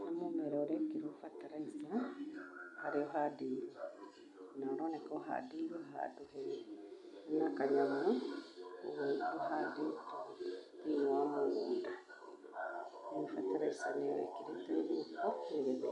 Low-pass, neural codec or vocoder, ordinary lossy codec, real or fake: 10.8 kHz; none; none; real